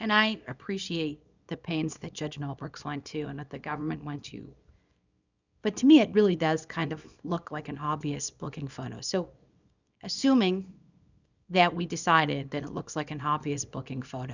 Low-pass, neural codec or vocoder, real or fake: 7.2 kHz; codec, 24 kHz, 0.9 kbps, WavTokenizer, small release; fake